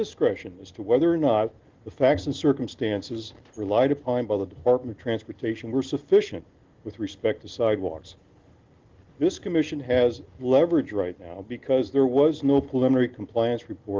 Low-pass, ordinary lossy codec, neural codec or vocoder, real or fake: 7.2 kHz; Opus, 16 kbps; none; real